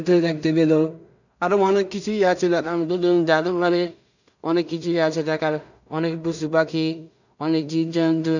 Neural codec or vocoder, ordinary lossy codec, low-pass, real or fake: codec, 16 kHz in and 24 kHz out, 0.4 kbps, LongCat-Audio-Codec, two codebook decoder; none; 7.2 kHz; fake